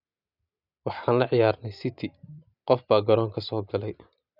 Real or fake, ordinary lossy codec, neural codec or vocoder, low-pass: real; none; none; 5.4 kHz